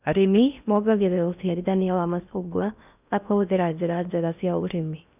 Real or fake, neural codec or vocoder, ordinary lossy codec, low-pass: fake; codec, 16 kHz in and 24 kHz out, 0.6 kbps, FocalCodec, streaming, 4096 codes; none; 3.6 kHz